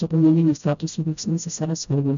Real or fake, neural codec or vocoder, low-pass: fake; codec, 16 kHz, 0.5 kbps, FreqCodec, smaller model; 7.2 kHz